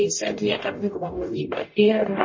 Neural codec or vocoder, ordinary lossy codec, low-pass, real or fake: codec, 44.1 kHz, 0.9 kbps, DAC; MP3, 32 kbps; 7.2 kHz; fake